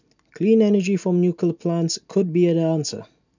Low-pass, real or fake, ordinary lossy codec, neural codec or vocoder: 7.2 kHz; real; none; none